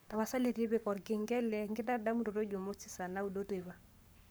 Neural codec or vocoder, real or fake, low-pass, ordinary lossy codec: codec, 44.1 kHz, 7.8 kbps, Pupu-Codec; fake; none; none